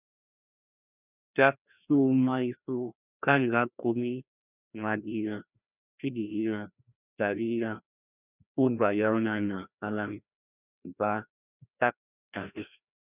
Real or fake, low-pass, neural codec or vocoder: fake; 3.6 kHz; codec, 16 kHz, 1 kbps, FreqCodec, larger model